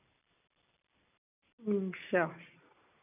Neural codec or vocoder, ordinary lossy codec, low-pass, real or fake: none; none; 3.6 kHz; real